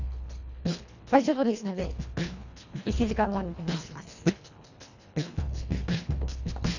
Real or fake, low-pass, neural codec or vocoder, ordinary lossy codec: fake; 7.2 kHz; codec, 24 kHz, 1.5 kbps, HILCodec; none